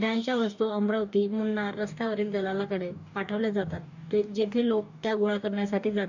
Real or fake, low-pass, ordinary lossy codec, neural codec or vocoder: fake; 7.2 kHz; none; codec, 44.1 kHz, 2.6 kbps, DAC